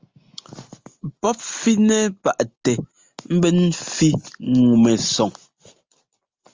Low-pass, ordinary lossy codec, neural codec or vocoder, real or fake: 7.2 kHz; Opus, 32 kbps; none; real